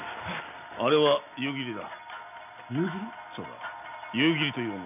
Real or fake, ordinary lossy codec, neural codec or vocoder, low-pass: real; none; none; 3.6 kHz